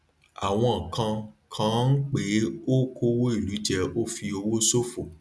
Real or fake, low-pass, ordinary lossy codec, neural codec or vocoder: real; none; none; none